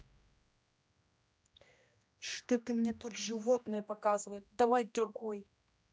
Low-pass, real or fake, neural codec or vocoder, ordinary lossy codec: none; fake; codec, 16 kHz, 1 kbps, X-Codec, HuBERT features, trained on general audio; none